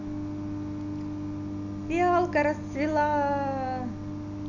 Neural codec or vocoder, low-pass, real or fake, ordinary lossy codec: none; 7.2 kHz; real; none